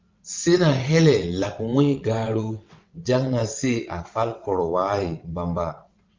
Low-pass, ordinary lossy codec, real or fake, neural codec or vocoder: 7.2 kHz; Opus, 24 kbps; fake; vocoder, 22.05 kHz, 80 mel bands, WaveNeXt